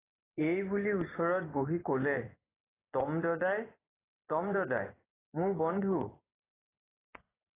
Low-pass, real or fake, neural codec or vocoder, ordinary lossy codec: 3.6 kHz; fake; vocoder, 24 kHz, 100 mel bands, Vocos; AAC, 16 kbps